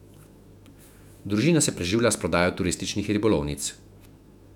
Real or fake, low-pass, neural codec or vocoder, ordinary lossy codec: fake; 19.8 kHz; autoencoder, 48 kHz, 128 numbers a frame, DAC-VAE, trained on Japanese speech; none